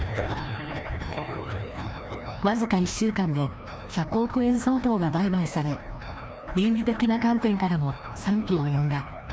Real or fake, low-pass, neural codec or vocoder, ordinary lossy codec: fake; none; codec, 16 kHz, 1 kbps, FreqCodec, larger model; none